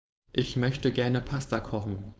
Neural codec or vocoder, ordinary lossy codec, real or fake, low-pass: codec, 16 kHz, 4.8 kbps, FACodec; none; fake; none